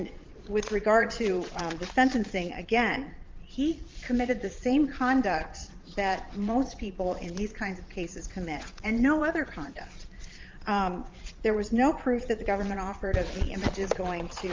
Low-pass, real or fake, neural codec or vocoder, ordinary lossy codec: 7.2 kHz; fake; vocoder, 22.05 kHz, 80 mel bands, Vocos; Opus, 24 kbps